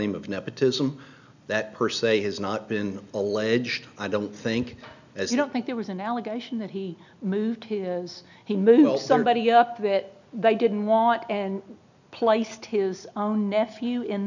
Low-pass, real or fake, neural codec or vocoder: 7.2 kHz; real; none